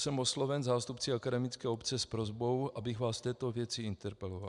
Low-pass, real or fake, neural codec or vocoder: 10.8 kHz; real; none